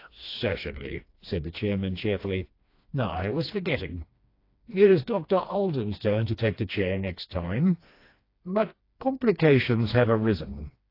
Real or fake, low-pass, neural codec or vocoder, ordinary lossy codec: fake; 5.4 kHz; codec, 16 kHz, 2 kbps, FreqCodec, smaller model; AAC, 32 kbps